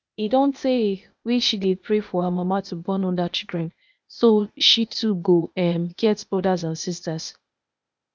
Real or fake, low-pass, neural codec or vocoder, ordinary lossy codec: fake; none; codec, 16 kHz, 0.8 kbps, ZipCodec; none